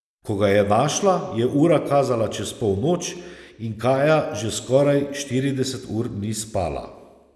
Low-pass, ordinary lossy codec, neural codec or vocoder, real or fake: none; none; none; real